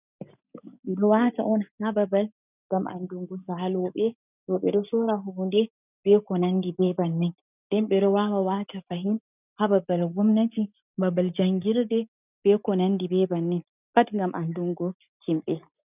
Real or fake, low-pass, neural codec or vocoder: real; 3.6 kHz; none